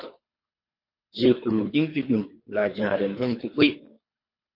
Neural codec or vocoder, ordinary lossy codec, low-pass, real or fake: codec, 24 kHz, 3 kbps, HILCodec; MP3, 32 kbps; 5.4 kHz; fake